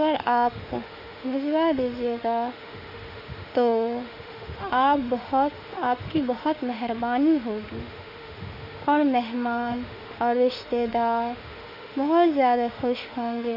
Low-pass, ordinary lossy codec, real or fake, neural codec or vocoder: 5.4 kHz; none; fake; autoencoder, 48 kHz, 32 numbers a frame, DAC-VAE, trained on Japanese speech